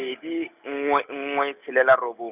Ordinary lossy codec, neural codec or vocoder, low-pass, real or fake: none; none; 3.6 kHz; real